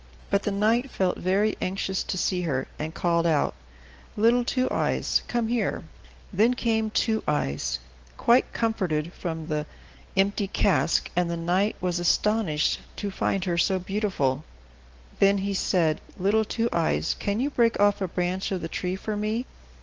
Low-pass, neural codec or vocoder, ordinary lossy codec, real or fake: 7.2 kHz; none; Opus, 16 kbps; real